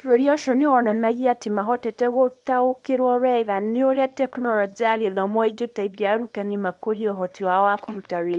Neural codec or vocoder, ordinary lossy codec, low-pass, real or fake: codec, 24 kHz, 0.9 kbps, WavTokenizer, small release; MP3, 64 kbps; 10.8 kHz; fake